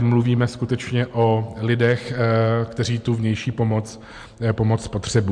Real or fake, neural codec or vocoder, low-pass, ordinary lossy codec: real; none; 9.9 kHz; MP3, 64 kbps